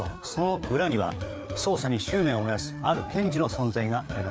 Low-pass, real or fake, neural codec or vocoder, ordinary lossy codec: none; fake; codec, 16 kHz, 4 kbps, FreqCodec, larger model; none